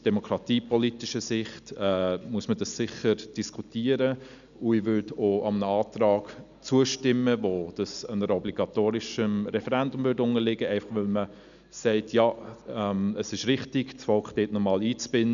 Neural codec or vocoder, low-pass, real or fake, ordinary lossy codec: none; 7.2 kHz; real; none